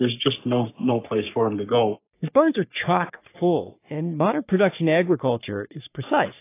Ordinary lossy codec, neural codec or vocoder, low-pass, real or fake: AAC, 24 kbps; codec, 44.1 kHz, 3.4 kbps, Pupu-Codec; 3.6 kHz; fake